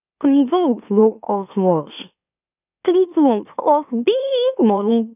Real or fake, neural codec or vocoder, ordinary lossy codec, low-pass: fake; autoencoder, 44.1 kHz, a latent of 192 numbers a frame, MeloTTS; none; 3.6 kHz